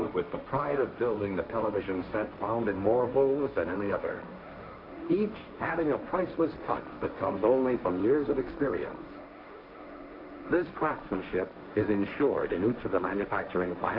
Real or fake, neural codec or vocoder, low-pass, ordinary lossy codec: fake; codec, 16 kHz, 1.1 kbps, Voila-Tokenizer; 5.4 kHz; AAC, 48 kbps